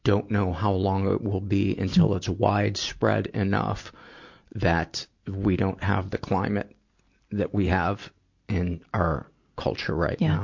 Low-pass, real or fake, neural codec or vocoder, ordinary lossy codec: 7.2 kHz; real; none; MP3, 48 kbps